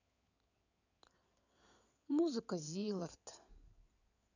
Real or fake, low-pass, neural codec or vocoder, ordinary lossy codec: fake; 7.2 kHz; codec, 16 kHz in and 24 kHz out, 2.2 kbps, FireRedTTS-2 codec; none